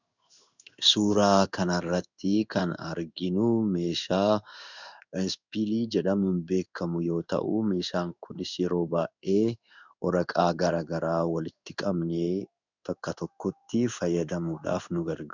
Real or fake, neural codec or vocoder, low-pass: fake; codec, 16 kHz in and 24 kHz out, 1 kbps, XY-Tokenizer; 7.2 kHz